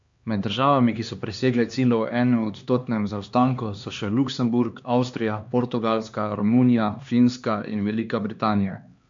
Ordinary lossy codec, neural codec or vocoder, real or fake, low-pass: MP3, 64 kbps; codec, 16 kHz, 2 kbps, X-Codec, HuBERT features, trained on LibriSpeech; fake; 7.2 kHz